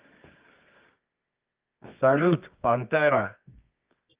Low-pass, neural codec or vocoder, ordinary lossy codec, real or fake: 3.6 kHz; codec, 24 kHz, 0.9 kbps, WavTokenizer, medium music audio release; Opus, 24 kbps; fake